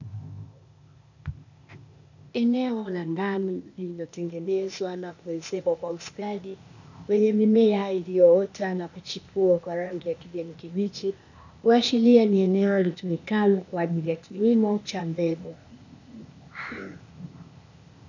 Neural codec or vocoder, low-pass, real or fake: codec, 16 kHz, 0.8 kbps, ZipCodec; 7.2 kHz; fake